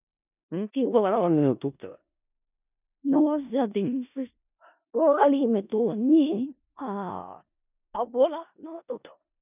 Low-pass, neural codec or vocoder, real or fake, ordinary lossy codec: 3.6 kHz; codec, 16 kHz in and 24 kHz out, 0.4 kbps, LongCat-Audio-Codec, four codebook decoder; fake; none